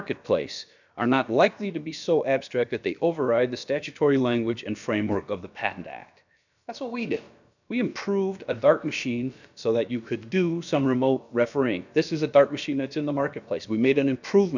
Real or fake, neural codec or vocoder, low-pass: fake; codec, 16 kHz, about 1 kbps, DyCAST, with the encoder's durations; 7.2 kHz